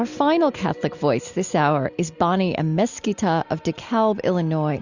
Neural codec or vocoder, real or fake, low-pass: none; real; 7.2 kHz